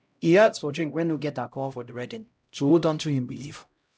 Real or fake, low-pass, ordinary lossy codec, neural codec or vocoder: fake; none; none; codec, 16 kHz, 0.5 kbps, X-Codec, HuBERT features, trained on LibriSpeech